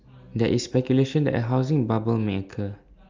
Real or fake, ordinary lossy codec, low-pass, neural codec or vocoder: real; Opus, 32 kbps; 7.2 kHz; none